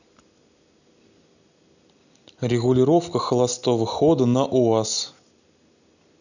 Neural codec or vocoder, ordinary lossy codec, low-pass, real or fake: none; none; 7.2 kHz; real